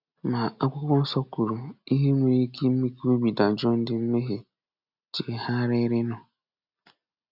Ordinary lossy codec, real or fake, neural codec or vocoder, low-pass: none; real; none; 5.4 kHz